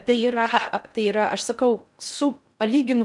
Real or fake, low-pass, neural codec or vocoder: fake; 10.8 kHz; codec, 16 kHz in and 24 kHz out, 0.6 kbps, FocalCodec, streaming, 4096 codes